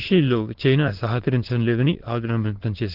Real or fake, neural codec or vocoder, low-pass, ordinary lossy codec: fake; autoencoder, 22.05 kHz, a latent of 192 numbers a frame, VITS, trained on many speakers; 5.4 kHz; Opus, 16 kbps